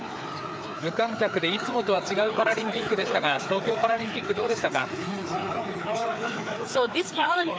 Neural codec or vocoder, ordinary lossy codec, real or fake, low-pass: codec, 16 kHz, 4 kbps, FreqCodec, larger model; none; fake; none